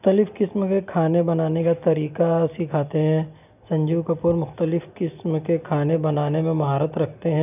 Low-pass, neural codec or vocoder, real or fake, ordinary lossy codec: 3.6 kHz; none; real; none